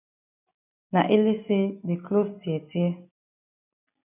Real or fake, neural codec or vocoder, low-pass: real; none; 3.6 kHz